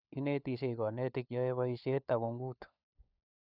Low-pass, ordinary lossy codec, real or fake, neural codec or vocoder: 5.4 kHz; none; fake; codec, 16 kHz, 4 kbps, FunCodec, trained on LibriTTS, 50 frames a second